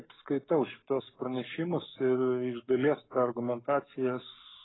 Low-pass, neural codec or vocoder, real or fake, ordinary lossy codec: 7.2 kHz; codec, 44.1 kHz, 7.8 kbps, Pupu-Codec; fake; AAC, 16 kbps